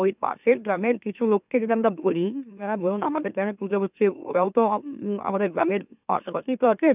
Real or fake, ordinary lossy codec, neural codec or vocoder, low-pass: fake; none; autoencoder, 44.1 kHz, a latent of 192 numbers a frame, MeloTTS; 3.6 kHz